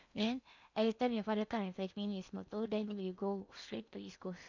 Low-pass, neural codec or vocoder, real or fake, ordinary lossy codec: 7.2 kHz; codec, 16 kHz in and 24 kHz out, 0.8 kbps, FocalCodec, streaming, 65536 codes; fake; none